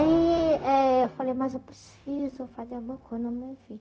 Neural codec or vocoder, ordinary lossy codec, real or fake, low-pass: codec, 16 kHz, 0.4 kbps, LongCat-Audio-Codec; none; fake; none